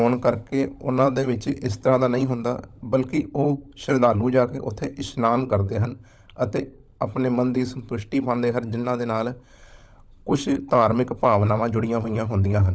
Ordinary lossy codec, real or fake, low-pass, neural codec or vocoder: none; fake; none; codec, 16 kHz, 16 kbps, FunCodec, trained on LibriTTS, 50 frames a second